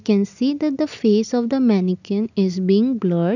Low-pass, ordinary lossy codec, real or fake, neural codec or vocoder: 7.2 kHz; none; real; none